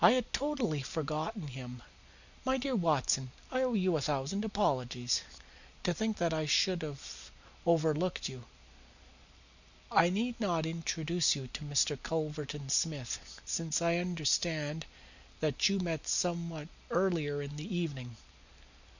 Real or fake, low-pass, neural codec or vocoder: real; 7.2 kHz; none